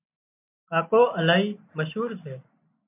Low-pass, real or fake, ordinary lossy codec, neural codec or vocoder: 3.6 kHz; real; MP3, 32 kbps; none